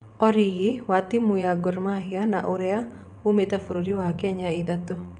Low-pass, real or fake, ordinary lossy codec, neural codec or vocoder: 9.9 kHz; fake; none; vocoder, 22.05 kHz, 80 mel bands, WaveNeXt